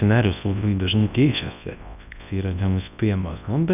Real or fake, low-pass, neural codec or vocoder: fake; 3.6 kHz; codec, 24 kHz, 0.9 kbps, WavTokenizer, large speech release